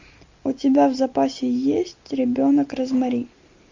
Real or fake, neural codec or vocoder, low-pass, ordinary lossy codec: real; none; 7.2 kHz; MP3, 64 kbps